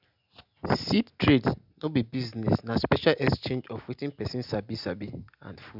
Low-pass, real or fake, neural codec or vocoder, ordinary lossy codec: 5.4 kHz; real; none; none